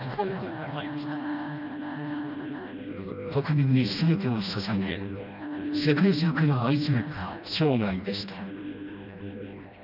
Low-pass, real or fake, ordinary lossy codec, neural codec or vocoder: 5.4 kHz; fake; none; codec, 16 kHz, 1 kbps, FreqCodec, smaller model